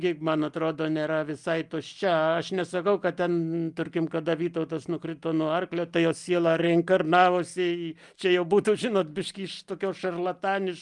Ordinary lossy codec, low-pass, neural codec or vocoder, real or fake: Opus, 32 kbps; 10.8 kHz; none; real